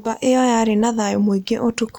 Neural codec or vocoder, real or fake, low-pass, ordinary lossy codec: none; real; 19.8 kHz; none